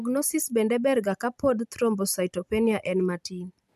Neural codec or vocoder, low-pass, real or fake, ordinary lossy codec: none; 14.4 kHz; real; none